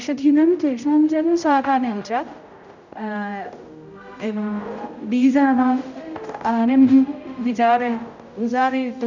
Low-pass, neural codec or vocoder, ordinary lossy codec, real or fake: 7.2 kHz; codec, 16 kHz, 0.5 kbps, X-Codec, HuBERT features, trained on general audio; none; fake